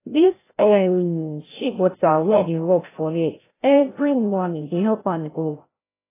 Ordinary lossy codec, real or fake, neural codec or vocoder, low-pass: AAC, 16 kbps; fake; codec, 16 kHz, 0.5 kbps, FreqCodec, larger model; 3.6 kHz